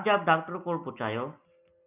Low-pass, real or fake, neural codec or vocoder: 3.6 kHz; real; none